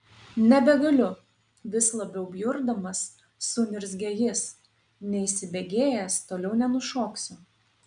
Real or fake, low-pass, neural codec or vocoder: real; 9.9 kHz; none